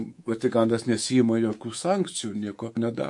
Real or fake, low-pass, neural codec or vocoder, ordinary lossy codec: fake; 10.8 kHz; codec, 24 kHz, 3.1 kbps, DualCodec; MP3, 48 kbps